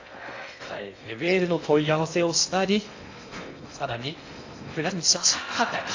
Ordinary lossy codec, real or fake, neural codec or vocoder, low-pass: AAC, 48 kbps; fake; codec, 16 kHz in and 24 kHz out, 0.6 kbps, FocalCodec, streaming, 2048 codes; 7.2 kHz